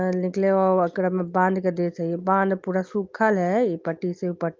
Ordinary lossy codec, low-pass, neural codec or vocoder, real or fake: Opus, 32 kbps; 7.2 kHz; none; real